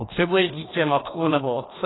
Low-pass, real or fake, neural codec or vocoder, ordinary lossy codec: 7.2 kHz; fake; codec, 16 kHz, 2 kbps, FreqCodec, larger model; AAC, 16 kbps